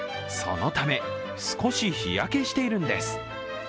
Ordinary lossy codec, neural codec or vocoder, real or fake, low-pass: none; none; real; none